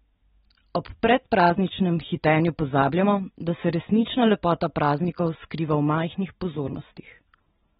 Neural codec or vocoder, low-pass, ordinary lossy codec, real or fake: none; 7.2 kHz; AAC, 16 kbps; real